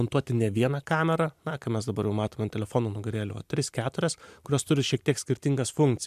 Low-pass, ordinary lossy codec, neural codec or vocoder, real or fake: 14.4 kHz; MP3, 96 kbps; none; real